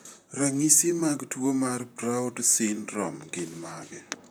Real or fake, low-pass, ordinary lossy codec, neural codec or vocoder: fake; none; none; vocoder, 44.1 kHz, 128 mel bands, Pupu-Vocoder